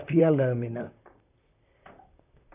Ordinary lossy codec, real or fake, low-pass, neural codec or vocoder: none; fake; 3.6 kHz; vocoder, 44.1 kHz, 128 mel bands, Pupu-Vocoder